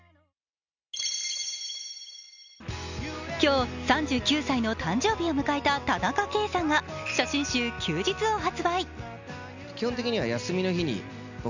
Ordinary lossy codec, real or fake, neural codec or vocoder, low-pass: none; real; none; 7.2 kHz